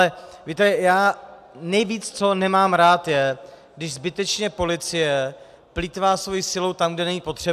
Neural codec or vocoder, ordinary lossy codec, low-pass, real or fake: none; AAC, 96 kbps; 14.4 kHz; real